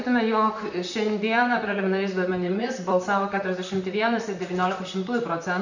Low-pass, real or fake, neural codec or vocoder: 7.2 kHz; fake; vocoder, 44.1 kHz, 128 mel bands, Pupu-Vocoder